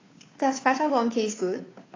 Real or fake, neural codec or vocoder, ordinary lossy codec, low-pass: fake; codec, 16 kHz, 2 kbps, FunCodec, trained on Chinese and English, 25 frames a second; AAC, 32 kbps; 7.2 kHz